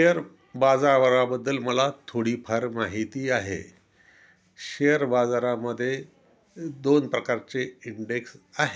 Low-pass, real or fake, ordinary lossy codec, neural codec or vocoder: none; real; none; none